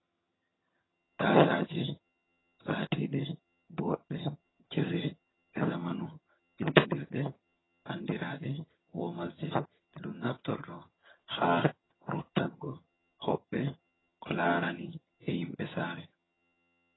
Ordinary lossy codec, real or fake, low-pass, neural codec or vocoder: AAC, 16 kbps; fake; 7.2 kHz; vocoder, 22.05 kHz, 80 mel bands, HiFi-GAN